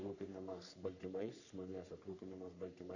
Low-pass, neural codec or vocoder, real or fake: 7.2 kHz; codec, 44.1 kHz, 3.4 kbps, Pupu-Codec; fake